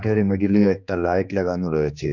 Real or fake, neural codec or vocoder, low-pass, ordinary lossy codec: fake; codec, 16 kHz, 2 kbps, X-Codec, HuBERT features, trained on general audio; 7.2 kHz; none